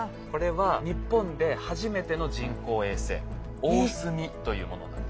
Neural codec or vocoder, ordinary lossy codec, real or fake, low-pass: none; none; real; none